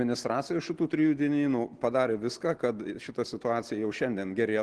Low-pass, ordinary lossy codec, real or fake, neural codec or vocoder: 10.8 kHz; Opus, 16 kbps; real; none